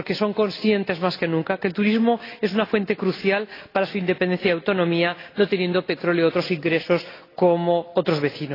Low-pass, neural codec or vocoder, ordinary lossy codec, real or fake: 5.4 kHz; none; AAC, 24 kbps; real